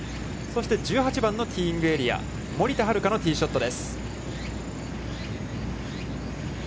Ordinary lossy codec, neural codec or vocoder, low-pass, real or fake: none; none; none; real